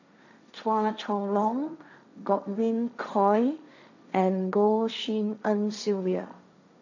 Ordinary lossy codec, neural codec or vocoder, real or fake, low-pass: none; codec, 16 kHz, 1.1 kbps, Voila-Tokenizer; fake; 7.2 kHz